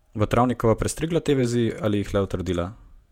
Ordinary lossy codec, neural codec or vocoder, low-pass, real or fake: MP3, 96 kbps; vocoder, 48 kHz, 128 mel bands, Vocos; 19.8 kHz; fake